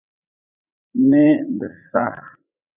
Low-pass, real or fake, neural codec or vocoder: 3.6 kHz; fake; vocoder, 44.1 kHz, 80 mel bands, Vocos